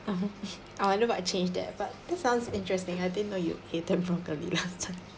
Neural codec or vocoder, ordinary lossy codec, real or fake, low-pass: none; none; real; none